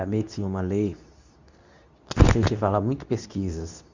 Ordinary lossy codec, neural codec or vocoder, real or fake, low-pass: Opus, 64 kbps; codec, 24 kHz, 0.9 kbps, WavTokenizer, medium speech release version 2; fake; 7.2 kHz